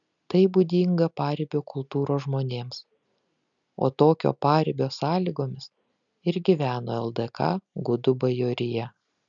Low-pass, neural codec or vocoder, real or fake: 7.2 kHz; none; real